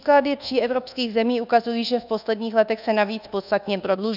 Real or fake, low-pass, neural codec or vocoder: fake; 5.4 kHz; codec, 24 kHz, 1.2 kbps, DualCodec